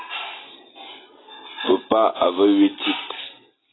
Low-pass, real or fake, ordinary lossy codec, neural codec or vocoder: 7.2 kHz; real; AAC, 16 kbps; none